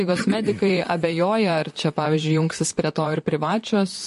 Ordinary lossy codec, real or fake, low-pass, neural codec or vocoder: MP3, 48 kbps; fake; 14.4 kHz; vocoder, 44.1 kHz, 128 mel bands, Pupu-Vocoder